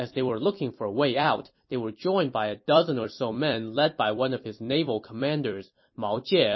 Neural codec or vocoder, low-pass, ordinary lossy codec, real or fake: vocoder, 44.1 kHz, 128 mel bands every 256 samples, BigVGAN v2; 7.2 kHz; MP3, 24 kbps; fake